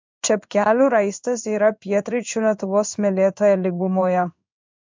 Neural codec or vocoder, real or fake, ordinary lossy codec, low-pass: codec, 16 kHz in and 24 kHz out, 1 kbps, XY-Tokenizer; fake; MP3, 64 kbps; 7.2 kHz